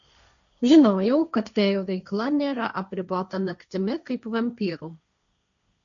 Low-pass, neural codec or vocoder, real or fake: 7.2 kHz; codec, 16 kHz, 1.1 kbps, Voila-Tokenizer; fake